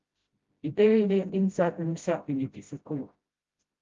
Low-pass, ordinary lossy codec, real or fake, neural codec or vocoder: 7.2 kHz; Opus, 32 kbps; fake; codec, 16 kHz, 0.5 kbps, FreqCodec, smaller model